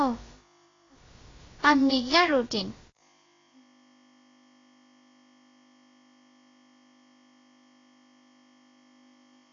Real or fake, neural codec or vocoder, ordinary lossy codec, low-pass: fake; codec, 16 kHz, about 1 kbps, DyCAST, with the encoder's durations; AAC, 32 kbps; 7.2 kHz